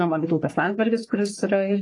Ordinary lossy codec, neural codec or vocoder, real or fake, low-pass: AAC, 32 kbps; codec, 44.1 kHz, 3.4 kbps, Pupu-Codec; fake; 10.8 kHz